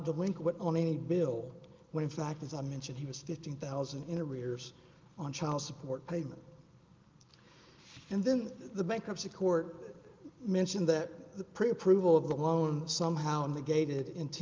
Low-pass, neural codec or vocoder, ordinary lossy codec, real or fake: 7.2 kHz; none; Opus, 32 kbps; real